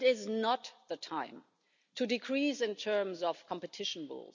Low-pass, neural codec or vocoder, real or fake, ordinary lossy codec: 7.2 kHz; none; real; none